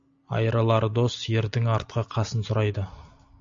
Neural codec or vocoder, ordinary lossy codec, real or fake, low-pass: none; AAC, 64 kbps; real; 7.2 kHz